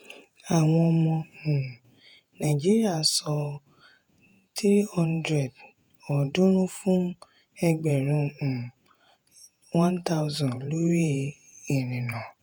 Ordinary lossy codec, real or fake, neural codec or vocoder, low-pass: none; fake; vocoder, 48 kHz, 128 mel bands, Vocos; none